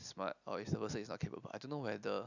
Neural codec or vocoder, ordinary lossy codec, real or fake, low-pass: none; none; real; 7.2 kHz